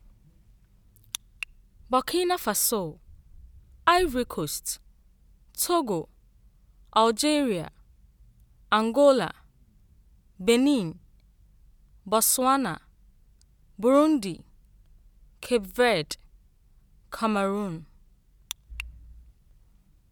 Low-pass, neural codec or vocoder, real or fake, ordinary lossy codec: none; none; real; none